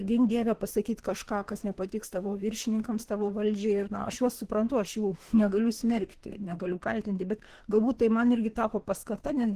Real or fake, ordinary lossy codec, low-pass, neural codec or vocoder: fake; Opus, 16 kbps; 14.4 kHz; autoencoder, 48 kHz, 32 numbers a frame, DAC-VAE, trained on Japanese speech